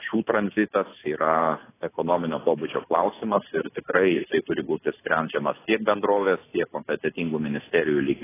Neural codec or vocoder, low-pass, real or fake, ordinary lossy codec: none; 3.6 kHz; real; AAC, 16 kbps